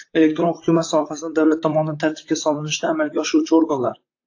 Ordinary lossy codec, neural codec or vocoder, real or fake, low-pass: AAC, 48 kbps; vocoder, 44.1 kHz, 128 mel bands, Pupu-Vocoder; fake; 7.2 kHz